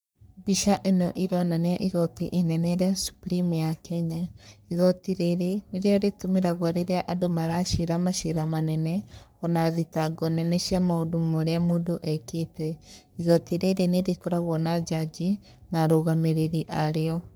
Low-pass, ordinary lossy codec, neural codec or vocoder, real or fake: none; none; codec, 44.1 kHz, 3.4 kbps, Pupu-Codec; fake